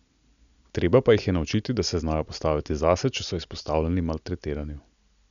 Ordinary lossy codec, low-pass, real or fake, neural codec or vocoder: none; 7.2 kHz; real; none